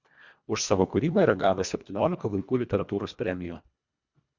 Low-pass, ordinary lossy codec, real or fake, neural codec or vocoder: 7.2 kHz; Opus, 64 kbps; fake; codec, 24 kHz, 1.5 kbps, HILCodec